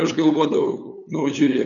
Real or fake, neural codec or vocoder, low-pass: fake; codec, 16 kHz, 8 kbps, FunCodec, trained on LibriTTS, 25 frames a second; 7.2 kHz